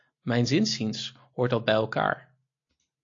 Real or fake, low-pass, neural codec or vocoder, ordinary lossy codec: real; 7.2 kHz; none; AAC, 48 kbps